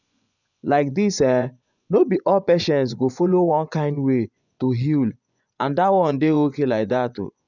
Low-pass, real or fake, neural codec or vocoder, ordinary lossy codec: 7.2 kHz; fake; vocoder, 24 kHz, 100 mel bands, Vocos; none